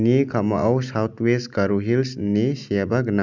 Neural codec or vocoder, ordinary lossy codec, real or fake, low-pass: none; none; real; 7.2 kHz